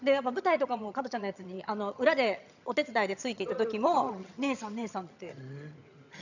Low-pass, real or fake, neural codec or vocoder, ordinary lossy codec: 7.2 kHz; fake; vocoder, 22.05 kHz, 80 mel bands, HiFi-GAN; none